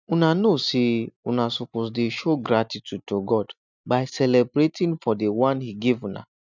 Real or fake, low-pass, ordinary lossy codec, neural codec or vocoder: real; 7.2 kHz; none; none